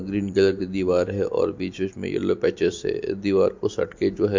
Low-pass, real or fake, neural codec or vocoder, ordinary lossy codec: 7.2 kHz; real; none; MP3, 48 kbps